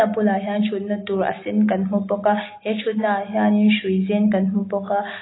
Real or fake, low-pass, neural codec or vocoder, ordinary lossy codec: real; 7.2 kHz; none; AAC, 16 kbps